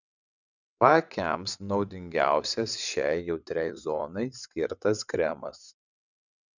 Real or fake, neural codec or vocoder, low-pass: fake; vocoder, 22.05 kHz, 80 mel bands, WaveNeXt; 7.2 kHz